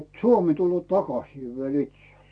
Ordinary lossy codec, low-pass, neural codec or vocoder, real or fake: AAC, 64 kbps; 9.9 kHz; none; real